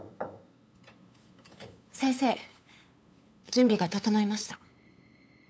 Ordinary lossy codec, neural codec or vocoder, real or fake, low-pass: none; codec, 16 kHz, 8 kbps, FunCodec, trained on LibriTTS, 25 frames a second; fake; none